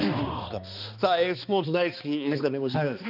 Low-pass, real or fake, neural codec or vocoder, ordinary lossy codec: 5.4 kHz; fake; codec, 16 kHz, 2 kbps, X-Codec, HuBERT features, trained on balanced general audio; none